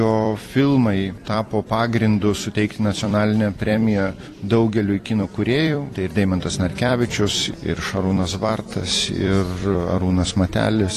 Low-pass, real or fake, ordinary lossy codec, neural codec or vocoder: 14.4 kHz; fake; AAC, 48 kbps; vocoder, 44.1 kHz, 128 mel bands every 256 samples, BigVGAN v2